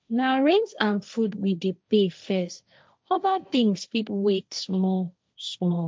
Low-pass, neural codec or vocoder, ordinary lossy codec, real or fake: none; codec, 16 kHz, 1.1 kbps, Voila-Tokenizer; none; fake